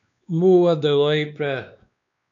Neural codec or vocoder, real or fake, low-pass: codec, 16 kHz, 2 kbps, X-Codec, WavLM features, trained on Multilingual LibriSpeech; fake; 7.2 kHz